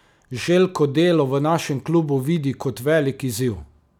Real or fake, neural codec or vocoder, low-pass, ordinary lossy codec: real; none; 19.8 kHz; none